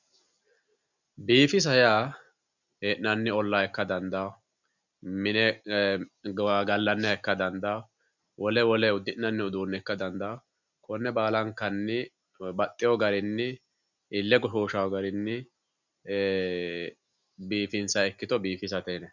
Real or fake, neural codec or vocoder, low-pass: real; none; 7.2 kHz